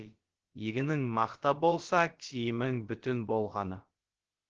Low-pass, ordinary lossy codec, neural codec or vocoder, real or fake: 7.2 kHz; Opus, 16 kbps; codec, 16 kHz, about 1 kbps, DyCAST, with the encoder's durations; fake